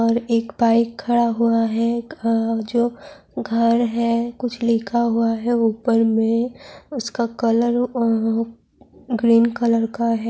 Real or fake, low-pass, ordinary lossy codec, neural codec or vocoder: real; none; none; none